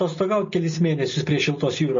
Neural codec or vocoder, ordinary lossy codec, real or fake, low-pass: none; MP3, 32 kbps; real; 7.2 kHz